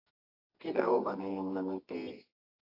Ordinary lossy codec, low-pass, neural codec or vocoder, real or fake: AAC, 32 kbps; 5.4 kHz; codec, 24 kHz, 0.9 kbps, WavTokenizer, medium music audio release; fake